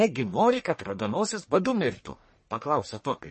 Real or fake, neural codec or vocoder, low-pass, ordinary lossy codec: fake; codec, 44.1 kHz, 1.7 kbps, Pupu-Codec; 10.8 kHz; MP3, 32 kbps